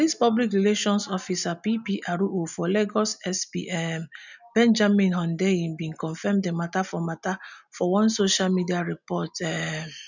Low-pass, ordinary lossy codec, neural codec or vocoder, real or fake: 7.2 kHz; none; none; real